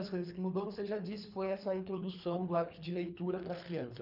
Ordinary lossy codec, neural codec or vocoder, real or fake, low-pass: none; codec, 24 kHz, 3 kbps, HILCodec; fake; 5.4 kHz